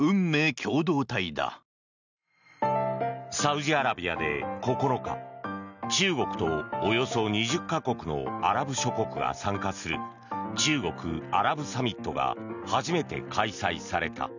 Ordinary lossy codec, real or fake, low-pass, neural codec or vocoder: none; real; 7.2 kHz; none